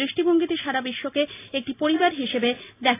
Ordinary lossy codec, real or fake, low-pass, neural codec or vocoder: AAC, 24 kbps; real; 3.6 kHz; none